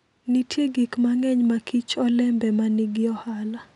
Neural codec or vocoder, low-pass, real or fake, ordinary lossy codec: none; 10.8 kHz; real; none